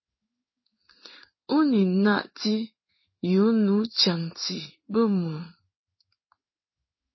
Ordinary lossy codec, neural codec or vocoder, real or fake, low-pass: MP3, 24 kbps; codec, 16 kHz in and 24 kHz out, 1 kbps, XY-Tokenizer; fake; 7.2 kHz